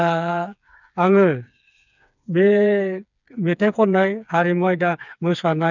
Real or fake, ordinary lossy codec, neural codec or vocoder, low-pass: fake; none; codec, 16 kHz, 4 kbps, FreqCodec, smaller model; 7.2 kHz